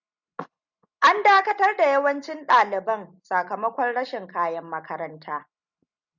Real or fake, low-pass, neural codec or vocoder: real; 7.2 kHz; none